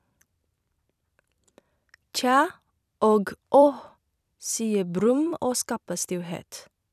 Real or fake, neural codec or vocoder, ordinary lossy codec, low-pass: fake; vocoder, 44.1 kHz, 128 mel bands every 256 samples, BigVGAN v2; none; 14.4 kHz